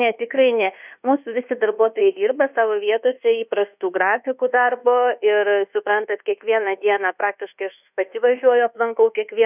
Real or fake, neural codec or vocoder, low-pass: fake; codec, 24 kHz, 1.2 kbps, DualCodec; 3.6 kHz